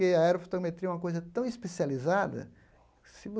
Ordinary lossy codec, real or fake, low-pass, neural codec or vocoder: none; real; none; none